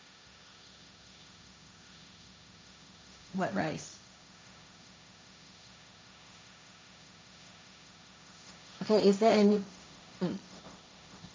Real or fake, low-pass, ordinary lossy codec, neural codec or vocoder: fake; none; none; codec, 16 kHz, 1.1 kbps, Voila-Tokenizer